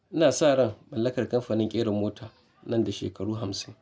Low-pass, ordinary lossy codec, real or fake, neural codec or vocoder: none; none; real; none